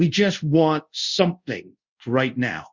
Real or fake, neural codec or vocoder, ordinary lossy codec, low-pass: fake; codec, 24 kHz, 0.5 kbps, DualCodec; Opus, 64 kbps; 7.2 kHz